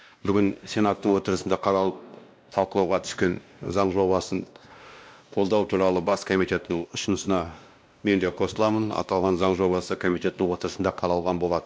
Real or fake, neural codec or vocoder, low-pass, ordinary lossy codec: fake; codec, 16 kHz, 1 kbps, X-Codec, WavLM features, trained on Multilingual LibriSpeech; none; none